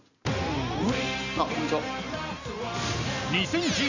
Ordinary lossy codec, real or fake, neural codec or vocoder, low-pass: none; real; none; 7.2 kHz